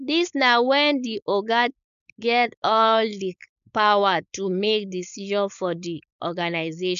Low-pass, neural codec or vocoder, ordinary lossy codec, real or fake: 7.2 kHz; codec, 16 kHz, 4.8 kbps, FACodec; none; fake